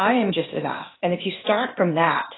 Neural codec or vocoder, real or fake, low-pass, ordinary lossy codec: codec, 16 kHz, 0.8 kbps, ZipCodec; fake; 7.2 kHz; AAC, 16 kbps